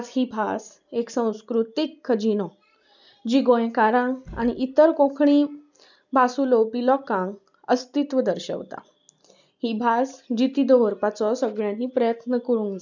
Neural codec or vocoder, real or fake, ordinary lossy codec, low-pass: none; real; none; 7.2 kHz